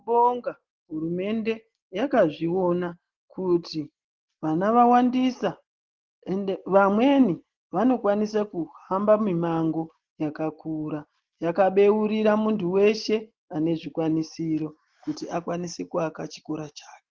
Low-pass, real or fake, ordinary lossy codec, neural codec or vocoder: 7.2 kHz; real; Opus, 16 kbps; none